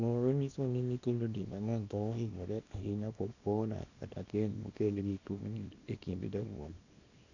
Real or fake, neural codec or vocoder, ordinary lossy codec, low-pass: fake; codec, 24 kHz, 0.9 kbps, WavTokenizer, small release; none; 7.2 kHz